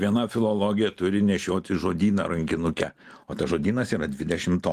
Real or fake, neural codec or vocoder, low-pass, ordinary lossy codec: real; none; 14.4 kHz; Opus, 24 kbps